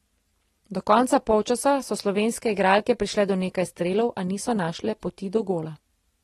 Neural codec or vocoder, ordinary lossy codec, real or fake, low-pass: none; AAC, 32 kbps; real; 19.8 kHz